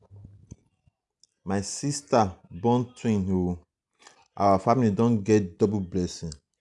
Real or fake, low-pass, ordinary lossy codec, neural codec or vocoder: real; 10.8 kHz; none; none